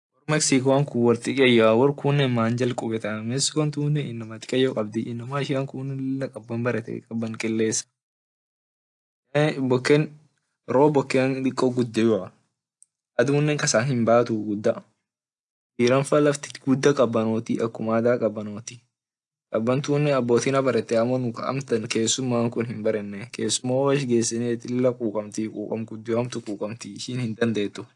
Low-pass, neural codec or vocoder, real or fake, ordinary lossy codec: 10.8 kHz; none; real; AAC, 64 kbps